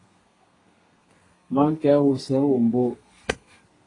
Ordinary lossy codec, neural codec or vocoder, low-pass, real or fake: AAC, 32 kbps; codec, 32 kHz, 1.9 kbps, SNAC; 10.8 kHz; fake